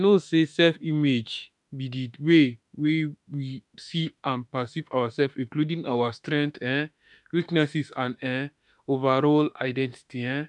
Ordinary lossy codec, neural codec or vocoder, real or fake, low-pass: none; autoencoder, 48 kHz, 32 numbers a frame, DAC-VAE, trained on Japanese speech; fake; 10.8 kHz